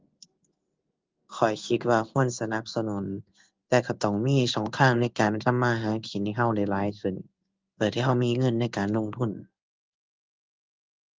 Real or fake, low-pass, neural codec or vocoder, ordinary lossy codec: fake; 7.2 kHz; codec, 16 kHz in and 24 kHz out, 1 kbps, XY-Tokenizer; Opus, 24 kbps